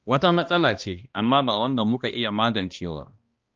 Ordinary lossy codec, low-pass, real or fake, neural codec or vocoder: Opus, 24 kbps; 7.2 kHz; fake; codec, 16 kHz, 1 kbps, X-Codec, HuBERT features, trained on balanced general audio